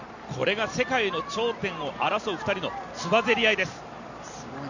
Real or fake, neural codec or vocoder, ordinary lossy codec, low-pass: real; none; none; 7.2 kHz